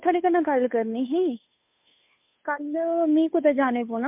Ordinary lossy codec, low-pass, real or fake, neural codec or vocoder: MP3, 32 kbps; 3.6 kHz; fake; codec, 16 kHz, 2 kbps, FunCodec, trained on Chinese and English, 25 frames a second